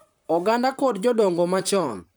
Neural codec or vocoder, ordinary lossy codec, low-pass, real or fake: vocoder, 44.1 kHz, 128 mel bands, Pupu-Vocoder; none; none; fake